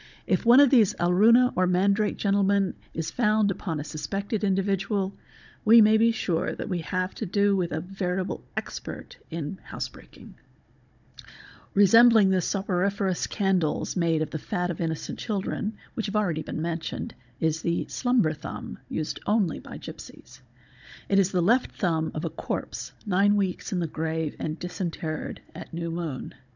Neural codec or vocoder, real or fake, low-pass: codec, 16 kHz, 16 kbps, FunCodec, trained on Chinese and English, 50 frames a second; fake; 7.2 kHz